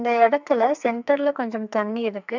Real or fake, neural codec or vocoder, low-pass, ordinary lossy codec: fake; codec, 44.1 kHz, 2.6 kbps, SNAC; 7.2 kHz; none